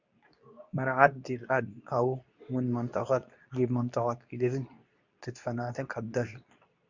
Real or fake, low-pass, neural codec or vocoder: fake; 7.2 kHz; codec, 24 kHz, 0.9 kbps, WavTokenizer, medium speech release version 2